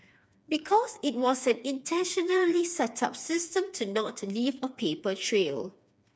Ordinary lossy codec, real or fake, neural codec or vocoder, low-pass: none; fake; codec, 16 kHz, 4 kbps, FreqCodec, smaller model; none